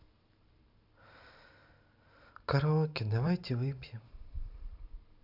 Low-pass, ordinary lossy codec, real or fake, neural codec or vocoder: 5.4 kHz; none; fake; vocoder, 44.1 kHz, 128 mel bands every 512 samples, BigVGAN v2